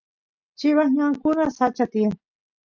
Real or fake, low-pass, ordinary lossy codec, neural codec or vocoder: real; 7.2 kHz; MP3, 64 kbps; none